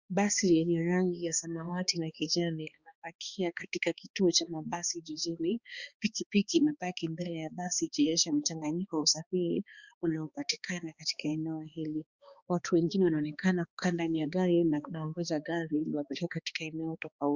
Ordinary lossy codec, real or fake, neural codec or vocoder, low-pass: Opus, 64 kbps; fake; codec, 16 kHz, 2 kbps, X-Codec, HuBERT features, trained on balanced general audio; 7.2 kHz